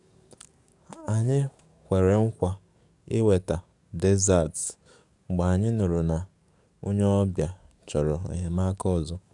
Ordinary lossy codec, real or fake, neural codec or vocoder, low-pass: none; fake; codec, 44.1 kHz, 7.8 kbps, DAC; 10.8 kHz